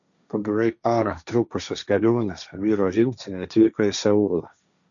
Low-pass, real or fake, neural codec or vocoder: 7.2 kHz; fake; codec, 16 kHz, 1.1 kbps, Voila-Tokenizer